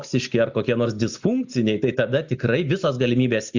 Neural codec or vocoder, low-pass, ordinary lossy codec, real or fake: none; 7.2 kHz; Opus, 64 kbps; real